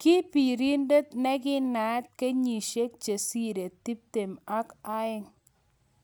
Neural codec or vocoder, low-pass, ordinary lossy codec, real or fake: none; none; none; real